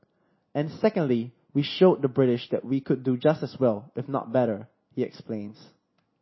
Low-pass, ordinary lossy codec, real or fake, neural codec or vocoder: 7.2 kHz; MP3, 24 kbps; real; none